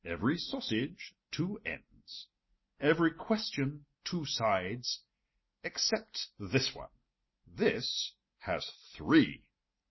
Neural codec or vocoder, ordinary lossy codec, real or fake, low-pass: none; MP3, 24 kbps; real; 7.2 kHz